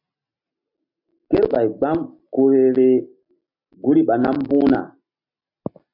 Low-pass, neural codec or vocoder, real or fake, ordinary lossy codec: 5.4 kHz; none; real; MP3, 48 kbps